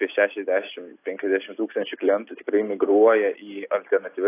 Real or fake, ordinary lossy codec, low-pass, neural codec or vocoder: real; AAC, 24 kbps; 3.6 kHz; none